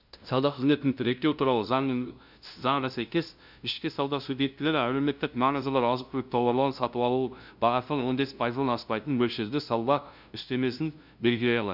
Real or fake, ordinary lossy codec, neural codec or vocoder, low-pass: fake; none; codec, 16 kHz, 0.5 kbps, FunCodec, trained on LibriTTS, 25 frames a second; 5.4 kHz